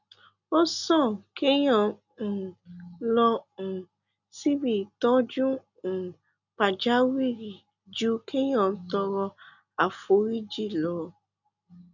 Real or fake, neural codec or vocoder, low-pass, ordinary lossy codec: real; none; 7.2 kHz; none